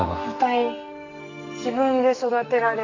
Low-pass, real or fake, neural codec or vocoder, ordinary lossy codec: 7.2 kHz; fake; codec, 44.1 kHz, 2.6 kbps, SNAC; none